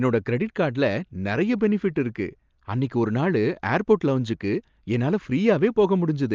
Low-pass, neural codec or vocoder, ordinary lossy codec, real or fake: 7.2 kHz; none; Opus, 32 kbps; real